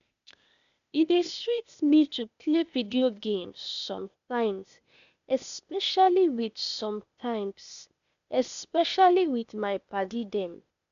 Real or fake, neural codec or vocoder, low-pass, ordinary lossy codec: fake; codec, 16 kHz, 0.8 kbps, ZipCodec; 7.2 kHz; none